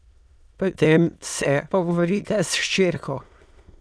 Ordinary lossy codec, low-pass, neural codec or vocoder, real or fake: none; none; autoencoder, 22.05 kHz, a latent of 192 numbers a frame, VITS, trained on many speakers; fake